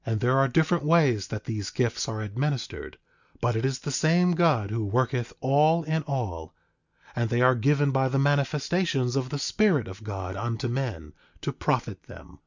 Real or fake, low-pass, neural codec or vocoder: real; 7.2 kHz; none